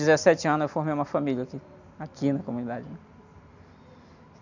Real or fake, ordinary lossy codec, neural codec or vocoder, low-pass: real; none; none; 7.2 kHz